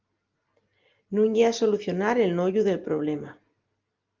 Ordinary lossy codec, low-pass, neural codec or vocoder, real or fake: Opus, 24 kbps; 7.2 kHz; none; real